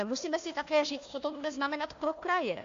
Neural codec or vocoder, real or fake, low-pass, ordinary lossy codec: codec, 16 kHz, 1 kbps, FunCodec, trained on Chinese and English, 50 frames a second; fake; 7.2 kHz; AAC, 48 kbps